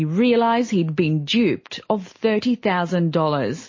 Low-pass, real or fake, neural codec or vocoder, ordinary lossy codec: 7.2 kHz; real; none; MP3, 32 kbps